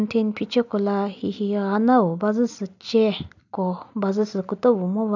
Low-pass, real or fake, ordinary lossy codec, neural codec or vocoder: 7.2 kHz; real; none; none